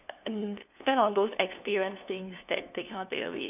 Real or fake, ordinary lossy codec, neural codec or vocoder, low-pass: fake; none; codec, 16 kHz, 2 kbps, FunCodec, trained on LibriTTS, 25 frames a second; 3.6 kHz